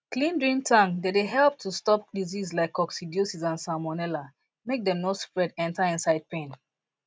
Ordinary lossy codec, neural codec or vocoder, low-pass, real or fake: none; none; none; real